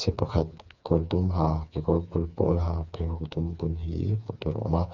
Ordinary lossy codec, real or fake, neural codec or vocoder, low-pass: none; fake; codec, 16 kHz, 4 kbps, FreqCodec, smaller model; 7.2 kHz